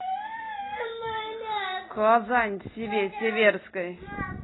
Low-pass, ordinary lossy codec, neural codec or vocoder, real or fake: 7.2 kHz; AAC, 16 kbps; none; real